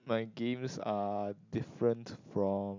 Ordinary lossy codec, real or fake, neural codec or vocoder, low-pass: MP3, 64 kbps; real; none; 7.2 kHz